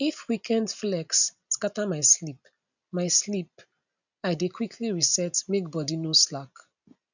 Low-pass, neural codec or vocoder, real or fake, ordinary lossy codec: 7.2 kHz; none; real; none